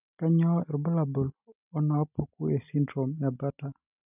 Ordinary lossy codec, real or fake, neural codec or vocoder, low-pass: none; real; none; 3.6 kHz